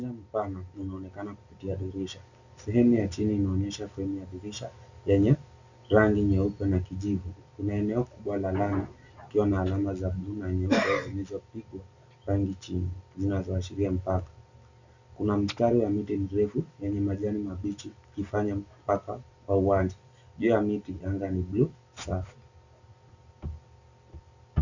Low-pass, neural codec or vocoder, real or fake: 7.2 kHz; none; real